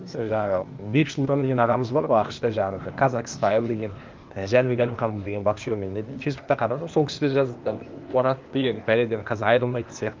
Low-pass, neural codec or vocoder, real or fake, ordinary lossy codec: 7.2 kHz; codec, 16 kHz, 0.8 kbps, ZipCodec; fake; Opus, 24 kbps